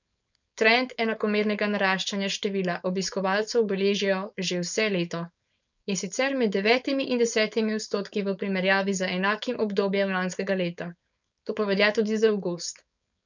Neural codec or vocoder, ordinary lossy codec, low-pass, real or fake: codec, 16 kHz, 4.8 kbps, FACodec; none; 7.2 kHz; fake